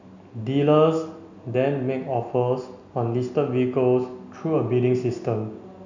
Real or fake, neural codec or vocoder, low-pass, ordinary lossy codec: real; none; 7.2 kHz; none